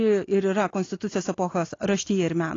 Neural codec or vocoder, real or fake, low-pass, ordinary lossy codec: none; real; 7.2 kHz; AAC, 32 kbps